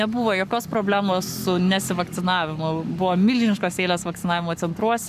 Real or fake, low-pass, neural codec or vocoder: fake; 14.4 kHz; codec, 44.1 kHz, 7.8 kbps, Pupu-Codec